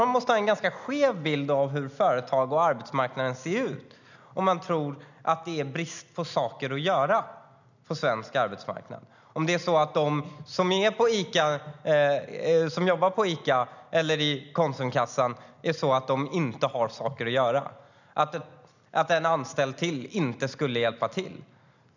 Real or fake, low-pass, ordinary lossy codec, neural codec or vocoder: real; 7.2 kHz; none; none